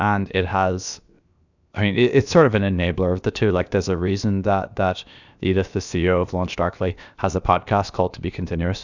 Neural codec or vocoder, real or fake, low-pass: codec, 16 kHz, 0.7 kbps, FocalCodec; fake; 7.2 kHz